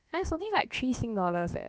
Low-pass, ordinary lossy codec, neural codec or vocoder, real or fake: none; none; codec, 16 kHz, about 1 kbps, DyCAST, with the encoder's durations; fake